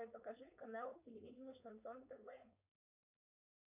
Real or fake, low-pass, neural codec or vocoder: fake; 3.6 kHz; codec, 16 kHz, 4.8 kbps, FACodec